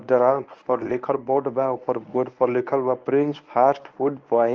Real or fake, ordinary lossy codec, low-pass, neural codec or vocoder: fake; Opus, 24 kbps; 7.2 kHz; codec, 24 kHz, 0.9 kbps, WavTokenizer, medium speech release version 1